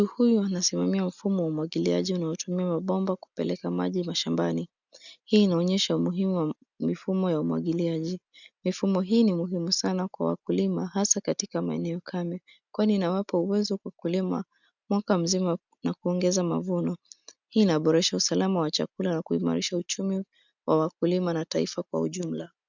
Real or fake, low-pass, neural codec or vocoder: real; 7.2 kHz; none